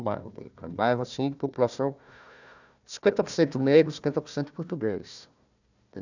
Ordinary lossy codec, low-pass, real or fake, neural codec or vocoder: none; 7.2 kHz; fake; codec, 16 kHz, 1 kbps, FunCodec, trained on Chinese and English, 50 frames a second